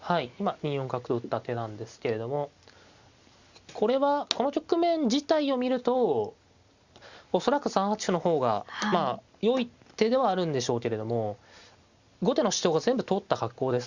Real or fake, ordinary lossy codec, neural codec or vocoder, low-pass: real; Opus, 64 kbps; none; 7.2 kHz